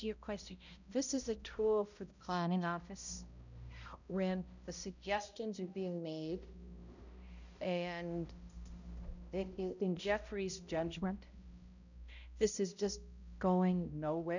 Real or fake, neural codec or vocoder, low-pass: fake; codec, 16 kHz, 0.5 kbps, X-Codec, HuBERT features, trained on balanced general audio; 7.2 kHz